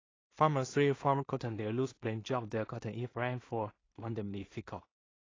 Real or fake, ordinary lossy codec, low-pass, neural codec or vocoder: fake; AAC, 32 kbps; 7.2 kHz; codec, 16 kHz in and 24 kHz out, 0.4 kbps, LongCat-Audio-Codec, two codebook decoder